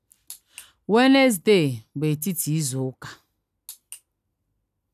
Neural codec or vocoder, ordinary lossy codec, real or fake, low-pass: none; none; real; 14.4 kHz